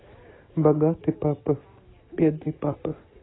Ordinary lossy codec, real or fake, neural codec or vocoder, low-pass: AAC, 16 kbps; real; none; 7.2 kHz